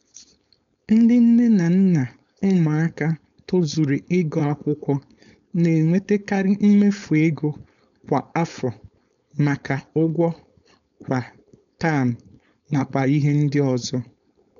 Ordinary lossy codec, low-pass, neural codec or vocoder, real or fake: MP3, 96 kbps; 7.2 kHz; codec, 16 kHz, 4.8 kbps, FACodec; fake